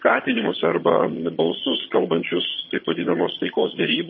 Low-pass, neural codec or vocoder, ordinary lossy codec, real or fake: 7.2 kHz; vocoder, 22.05 kHz, 80 mel bands, HiFi-GAN; MP3, 24 kbps; fake